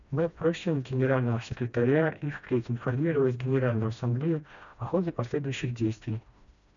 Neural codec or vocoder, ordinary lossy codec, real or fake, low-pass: codec, 16 kHz, 1 kbps, FreqCodec, smaller model; MP3, 96 kbps; fake; 7.2 kHz